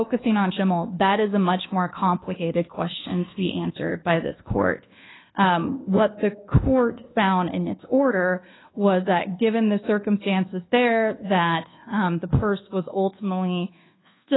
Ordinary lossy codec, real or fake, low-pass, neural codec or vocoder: AAC, 16 kbps; fake; 7.2 kHz; codec, 24 kHz, 1.2 kbps, DualCodec